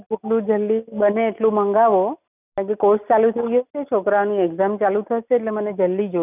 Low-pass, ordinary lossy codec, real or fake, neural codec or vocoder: 3.6 kHz; none; real; none